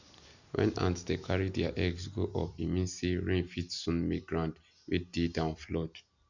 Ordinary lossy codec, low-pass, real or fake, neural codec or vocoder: none; 7.2 kHz; real; none